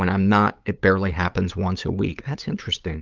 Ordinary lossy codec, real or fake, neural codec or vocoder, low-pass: Opus, 24 kbps; fake; codec, 16 kHz, 8 kbps, FunCodec, trained on LibriTTS, 25 frames a second; 7.2 kHz